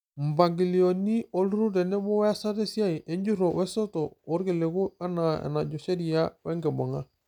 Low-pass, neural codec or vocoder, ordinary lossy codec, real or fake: 19.8 kHz; none; none; real